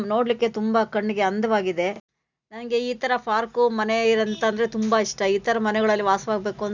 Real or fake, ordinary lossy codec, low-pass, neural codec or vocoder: real; none; 7.2 kHz; none